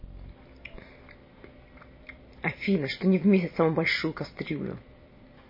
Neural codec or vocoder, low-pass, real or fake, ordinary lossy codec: vocoder, 44.1 kHz, 128 mel bands every 256 samples, BigVGAN v2; 5.4 kHz; fake; MP3, 24 kbps